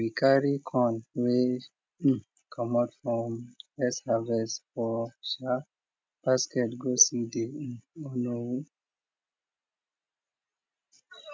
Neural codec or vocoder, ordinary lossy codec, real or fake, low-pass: none; none; real; none